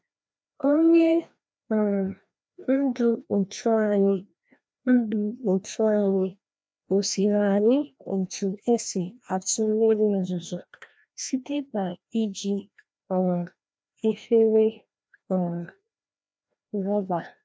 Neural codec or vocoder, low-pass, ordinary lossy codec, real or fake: codec, 16 kHz, 1 kbps, FreqCodec, larger model; none; none; fake